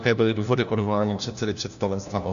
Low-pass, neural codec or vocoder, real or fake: 7.2 kHz; codec, 16 kHz, 1 kbps, FunCodec, trained on LibriTTS, 50 frames a second; fake